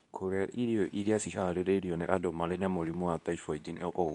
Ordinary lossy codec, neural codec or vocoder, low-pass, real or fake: none; codec, 24 kHz, 0.9 kbps, WavTokenizer, medium speech release version 2; 10.8 kHz; fake